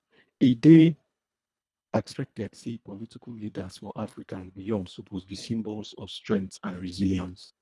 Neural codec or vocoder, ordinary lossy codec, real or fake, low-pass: codec, 24 kHz, 1.5 kbps, HILCodec; none; fake; none